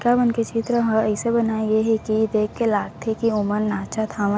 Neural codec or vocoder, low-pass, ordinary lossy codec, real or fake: none; none; none; real